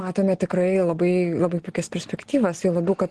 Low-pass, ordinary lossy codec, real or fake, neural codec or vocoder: 10.8 kHz; Opus, 16 kbps; real; none